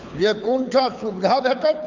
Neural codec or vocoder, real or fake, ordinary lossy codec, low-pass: codec, 24 kHz, 6 kbps, HILCodec; fake; MP3, 64 kbps; 7.2 kHz